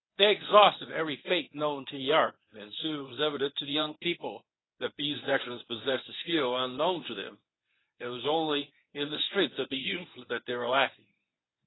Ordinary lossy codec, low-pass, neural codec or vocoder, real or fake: AAC, 16 kbps; 7.2 kHz; codec, 24 kHz, 0.9 kbps, WavTokenizer, medium speech release version 1; fake